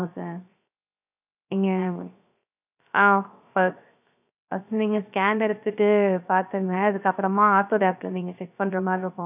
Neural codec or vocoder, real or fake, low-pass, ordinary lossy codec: codec, 16 kHz, 0.3 kbps, FocalCodec; fake; 3.6 kHz; none